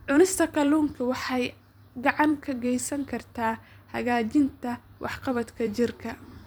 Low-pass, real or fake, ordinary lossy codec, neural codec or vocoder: none; fake; none; vocoder, 44.1 kHz, 128 mel bands every 256 samples, BigVGAN v2